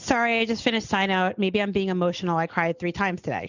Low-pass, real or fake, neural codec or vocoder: 7.2 kHz; real; none